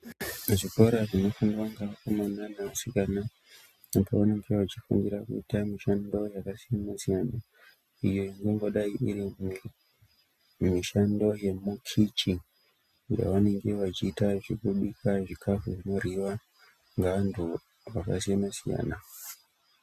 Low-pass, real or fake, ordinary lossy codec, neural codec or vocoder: 14.4 kHz; real; Opus, 64 kbps; none